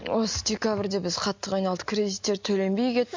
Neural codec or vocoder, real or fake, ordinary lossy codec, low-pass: none; real; MP3, 48 kbps; 7.2 kHz